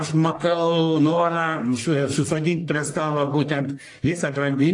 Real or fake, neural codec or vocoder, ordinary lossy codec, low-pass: fake; codec, 44.1 kHz, 1.7 kbps, Pupu-Codec; AAC, 48 kbps; 10.8 kHz